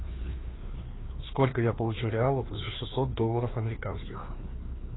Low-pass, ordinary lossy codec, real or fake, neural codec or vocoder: 7.2 kHz; AAC, 16 kbps; fake; codec, 16 kHz, 2 kbps, FreqCodec, larger model